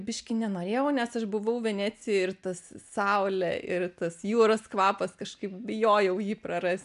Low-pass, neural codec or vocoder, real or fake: 10.8 kHz; none; real